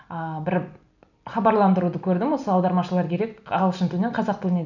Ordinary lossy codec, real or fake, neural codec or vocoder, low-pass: none; real; none; 7.2 kHz